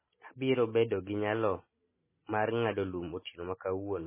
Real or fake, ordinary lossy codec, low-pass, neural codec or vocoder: real; MP3, 16 kbps; 3.6 kHz; none